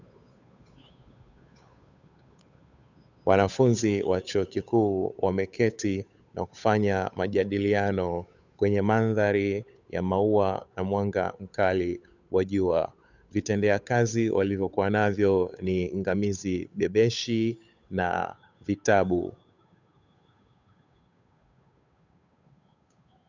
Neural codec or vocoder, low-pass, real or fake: codec, 16 kHz, 8 kbps, FunCodec, trained on Chinese and English, 25 frames a second; 7.2 kHz; fake